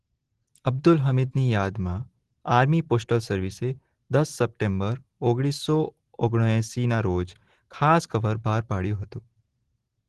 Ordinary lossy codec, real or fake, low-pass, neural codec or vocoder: Opus, 16 kbps; real; 10.8 kHz; none